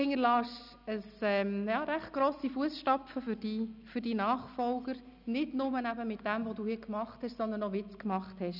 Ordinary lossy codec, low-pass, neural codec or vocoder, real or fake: none; 5.4 kHz; none; real